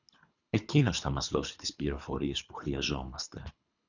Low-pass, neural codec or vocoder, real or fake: 7.2 kHz; codec, 24 kHz, 3 kbps, HILCodec; fake